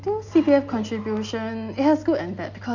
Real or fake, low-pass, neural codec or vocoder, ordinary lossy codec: real; 7.2 kHz; none; none